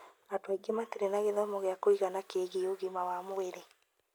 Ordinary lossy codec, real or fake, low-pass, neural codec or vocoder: none; real; none; none